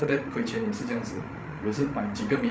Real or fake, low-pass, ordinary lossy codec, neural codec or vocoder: fake; none; none; codec, 16 kHz, 16 kbps, FreqCodec, larger model